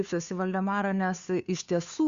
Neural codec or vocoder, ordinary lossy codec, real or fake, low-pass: codec, 16 kHz, 2 kbps, FunCodec, trained on Chinese and English, 25 frames a second; Opus, 64 kbps; fake; 7.2 kHz